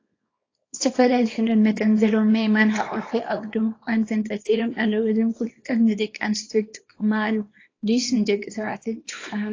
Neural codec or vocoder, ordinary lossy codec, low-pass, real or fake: codec, 24 kHz, 0.9 kbps, WavTokenizer, small release; AAC, 32 kbps; 7.2 kHz; fake